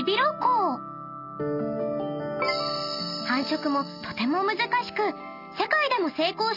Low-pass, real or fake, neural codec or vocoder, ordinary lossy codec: 5.4 kHz; real; none; none